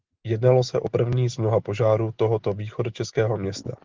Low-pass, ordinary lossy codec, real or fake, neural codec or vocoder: 7.2 kHz; Opus, 16 kbps; real; none